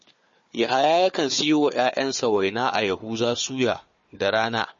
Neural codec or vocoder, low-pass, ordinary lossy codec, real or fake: codec, 16 kHz, 4 kbps, FunCodec, trained on Chinese and English, 50 frames a second; 7.2 kHz; MP3, 32 kbps; fake